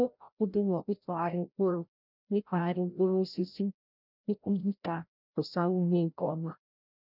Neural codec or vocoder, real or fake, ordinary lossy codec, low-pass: codec, 16 kHz, 0.5 kbps, FreqCodec, larger model; fake; none; 5.4 kHz